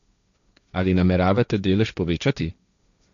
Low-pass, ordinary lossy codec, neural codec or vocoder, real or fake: 7.2 kHz; none; codec, 16 kHz, 1.1 kbps, Voila-Tokenizer; fake